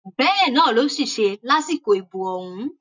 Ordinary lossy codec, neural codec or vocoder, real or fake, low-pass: none; none; real; 7.2 kHz